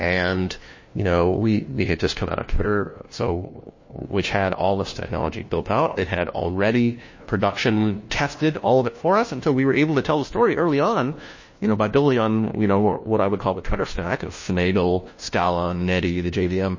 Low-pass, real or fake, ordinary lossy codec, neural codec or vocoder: 7.2 kHz; fake; MP3, 32 kbps; codec, 16 kHz, 1 kbps, FunCodec, trained on LibriTTS, 50 frames a second